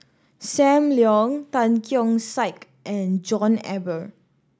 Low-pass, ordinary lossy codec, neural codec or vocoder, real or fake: none; none; none; real